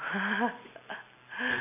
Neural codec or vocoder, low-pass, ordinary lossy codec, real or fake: none; 3.6 kHz; none; real